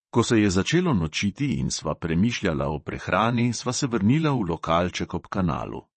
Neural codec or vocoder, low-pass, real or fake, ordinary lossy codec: vocoder, 22.05 kHz, 80 mel bands, WaveNeXt; 9.9 kHz; fake; MP3, 32 kbps